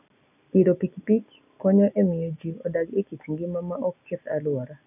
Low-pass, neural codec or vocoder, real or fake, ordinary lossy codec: 3.6 kHz; none; real; none